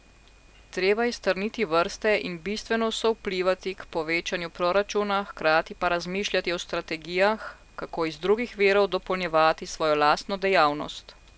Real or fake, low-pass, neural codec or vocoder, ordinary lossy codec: real; none; none; none